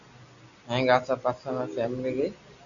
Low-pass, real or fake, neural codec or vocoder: 7.2 kHz; real; none